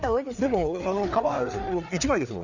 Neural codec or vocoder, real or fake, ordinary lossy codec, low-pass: codec, 16 kHz, 4 kbps, FreqCodec, larger model; fake; none; 7.2 kHz